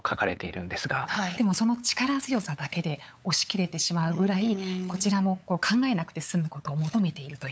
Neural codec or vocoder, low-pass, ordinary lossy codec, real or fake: codec, 16 kHz, 8 kbps, FunCodec, trained on LibriTTS, 25 frames a second; none; none; fake